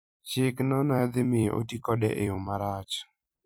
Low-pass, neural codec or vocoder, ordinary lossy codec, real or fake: none; vocoder, 44.1 kHz, 128 mel bands every 256 samples, BigVGAN v2; none; fake